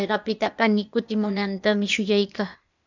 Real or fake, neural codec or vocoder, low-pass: fake; codec, 16 kHz, 0.8 kbps, ZipCodec; 7.2 kHz